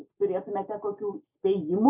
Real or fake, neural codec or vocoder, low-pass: real; none; 3.6 kHz